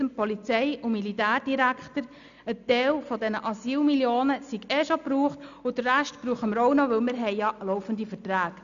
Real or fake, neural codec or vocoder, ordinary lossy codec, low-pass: real; none; none; 7.2 kHz